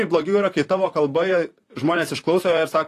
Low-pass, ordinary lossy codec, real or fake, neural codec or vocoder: 14.4 kHz; AAC, 48 kbps; fake; vocoder, 44.1 kHz, 128 mel bands, Pupu-Vocoder